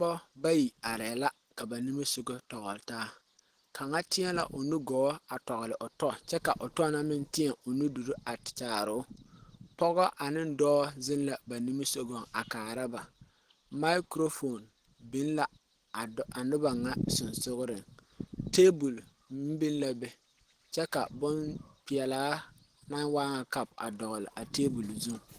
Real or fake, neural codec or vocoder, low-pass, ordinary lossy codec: real; none; 14.4 kHz; Opus, 16 kbps